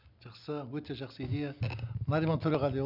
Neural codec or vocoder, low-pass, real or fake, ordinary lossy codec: none; 5.4 kHz; real; none